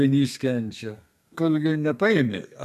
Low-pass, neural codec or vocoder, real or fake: 14.4 kHz; codec, 32 kHz, 1.9 kbps, SNAC; fake